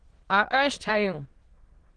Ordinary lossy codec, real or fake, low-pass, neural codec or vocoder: Opus, 16 kbps; fake; 9.9 kHz; autoencoder, 22.05 kHz, a latent of 192 numbers a frame, VITS, trained on many speakers